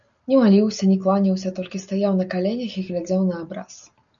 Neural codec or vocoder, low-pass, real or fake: none; 7.2 kHz; real